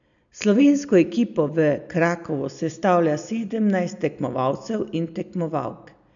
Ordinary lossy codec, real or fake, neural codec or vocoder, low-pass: none; real; none; 7.2 kHz